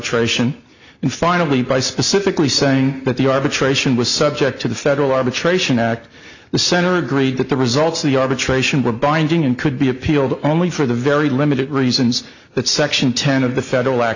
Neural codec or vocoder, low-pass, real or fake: none; 7.2 kHz; real